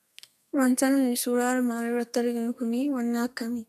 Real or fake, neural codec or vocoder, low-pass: fake; codec, 32 kHz, 1.9 kbps, SNAC; 14.4 kHz